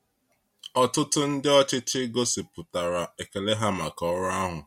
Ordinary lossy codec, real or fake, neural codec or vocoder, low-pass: MP3, 64 kbps; real; none; 19.8 kHz